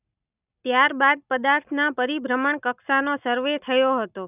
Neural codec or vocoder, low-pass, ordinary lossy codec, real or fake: none; 3.6 kHz; none; real